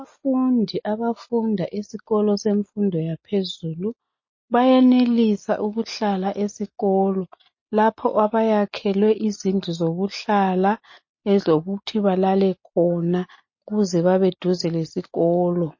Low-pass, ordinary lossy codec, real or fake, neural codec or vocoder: 7.2 kHz; MP3, 32 kbps; real; none